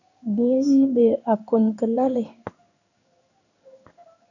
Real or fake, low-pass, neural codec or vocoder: fake; 7.2 kHz; codec, 16 kHz in and 24 kHz out, 1 kbps, XY-Tokenizer